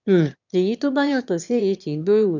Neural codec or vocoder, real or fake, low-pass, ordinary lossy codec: autoencoder, 22.05 kHz, a latent of 192 numbers a frame, VITS, trained on one speaker; fake; 7.2 kHz; none